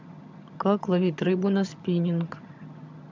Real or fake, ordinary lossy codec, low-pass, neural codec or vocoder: fake; MP3, 64 kbps; 7.2 kHz; vocoder, 22.05 kHz, 80 mel bands, HiFi-GAN